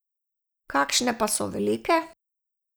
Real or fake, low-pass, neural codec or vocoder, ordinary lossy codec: real; none; none; none